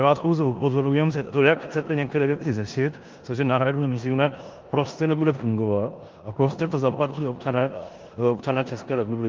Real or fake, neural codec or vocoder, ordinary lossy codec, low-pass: fake; codec, 16 kHz in and 24 kHz out, 0.9 kbps, LongCat-Audio-Codec, four codebook decoder; Opus, 32 kbps; 7.2 kHz